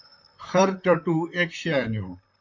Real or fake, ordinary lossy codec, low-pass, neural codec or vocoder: fake; MP3, 48 kbps; 7.2 kHz; vocoder, 44.1 kHz, 128 mel bands, Pupu-Vocoder